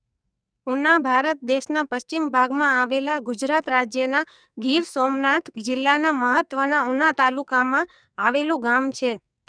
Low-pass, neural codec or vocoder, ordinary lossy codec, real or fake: 9.9 kHz; codec, 44.1 kHz, 2.6 kbps, SNAC; none; fake